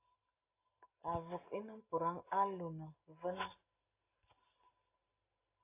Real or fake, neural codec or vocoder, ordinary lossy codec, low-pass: real; none; AAC, 32 kbps; 3.6 kHz